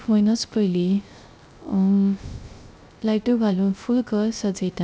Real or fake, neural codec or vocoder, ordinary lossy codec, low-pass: fake; codec, 16 kHz, 0.3 kbps, FocalCodec; none; none